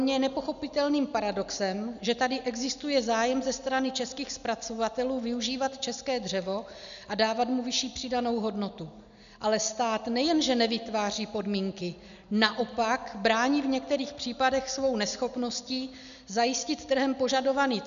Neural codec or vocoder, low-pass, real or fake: none; 7.2 kHz; real